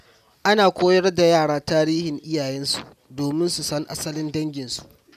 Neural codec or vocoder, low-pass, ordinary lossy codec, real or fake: none; 14.4 kHz; none; real